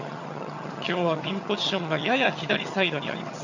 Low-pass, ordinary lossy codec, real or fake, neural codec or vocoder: 7.2 kHz; none; fake; vocoder, 22.05 kHz, 80 mel bands, HiFi-GAN